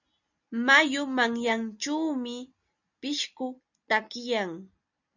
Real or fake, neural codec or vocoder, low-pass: real; none; 7.2 kHz